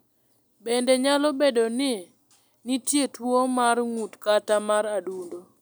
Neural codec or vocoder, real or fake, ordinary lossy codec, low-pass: none; real; none; none